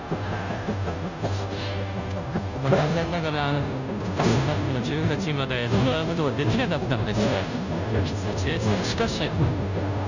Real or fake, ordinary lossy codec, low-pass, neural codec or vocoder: fake; none; 7.2 kHz; codec, 16 kHz, 0.5 kbps, FunCodec, trained on Chinese and English, 25 frames a second